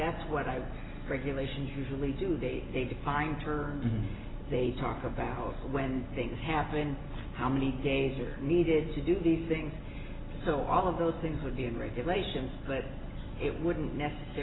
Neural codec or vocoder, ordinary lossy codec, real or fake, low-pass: none; AAC, 16 kbps; real; 7.2 kHz